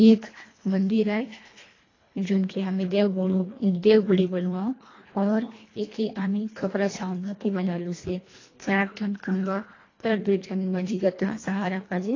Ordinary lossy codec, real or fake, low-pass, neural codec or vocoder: AAC, 32 kbps; fake; 7.2 kHz; codec, 24 kHz, 1.5 kbps, HILCodec